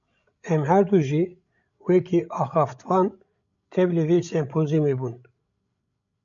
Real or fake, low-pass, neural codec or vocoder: fake; 7.2 kHz; codec, 16 kHz, 16 kbps, FreqCodec, larger model